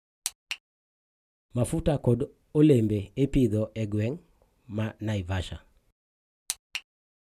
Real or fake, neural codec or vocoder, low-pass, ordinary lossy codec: real; none; 14.4 kHz; none